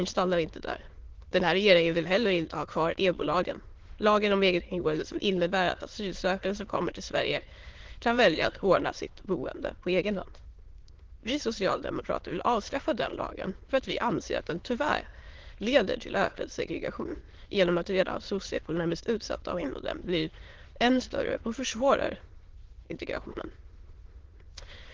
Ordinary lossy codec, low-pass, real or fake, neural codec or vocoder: Opus, 16 kbps; 7.2 kHz; fake; autoencoder, 22.05 kHz, a latent of 192 numbers a frame, VITS, trained on many speakers